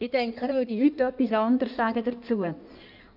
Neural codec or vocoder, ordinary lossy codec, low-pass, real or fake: codec, 16 kHz in and 24 kHz out, 1.1 kbps, FireRedTTS-2 codec; none; 5.4 kHz; fake